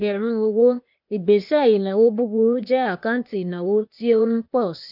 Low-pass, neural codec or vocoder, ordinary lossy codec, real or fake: 5.4 kHz; codec, 16 kHz, 0.8 kbps, ZipCodec; none; fake